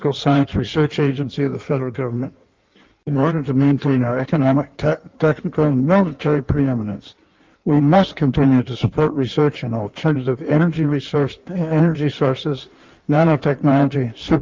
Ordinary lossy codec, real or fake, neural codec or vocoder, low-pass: Opus, 32 kbps; fake; codec, 16 kHz in and 24 kHz out, 1.1 kbps, FireRedTTS-2 codec; 7.2 kHz